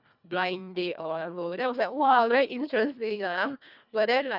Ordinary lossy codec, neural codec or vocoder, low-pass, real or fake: none; codec, 24 kHz, 1.5 kbps, HILCodec; 5.4 kHz; fake